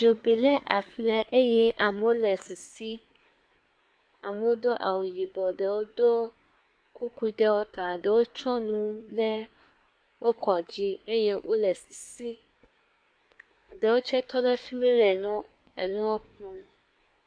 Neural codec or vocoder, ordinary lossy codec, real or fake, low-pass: codec, 24 kHz, 1 kbps, SNAC; AAC, 64 kbps; fake; 9.9 kHz